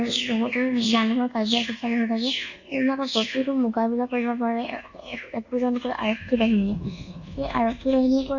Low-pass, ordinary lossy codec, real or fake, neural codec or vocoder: 7.2 kHz; Opus, 64 kbps; fake; codec, 24 kHz, 1.2 kbps, DualCodec